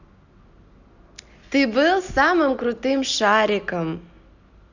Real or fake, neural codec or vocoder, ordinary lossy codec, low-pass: real; none; none; 7.2 kHz